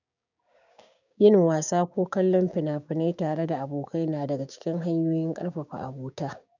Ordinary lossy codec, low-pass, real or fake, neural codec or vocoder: none; 7.2 kHz; fake; codec, 16 kHz, 6 kbps, DAC